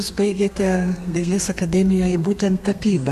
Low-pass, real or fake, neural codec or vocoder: 14.4 kHz; fake; codec, 32 kHz, 1.9 kbps, SNAC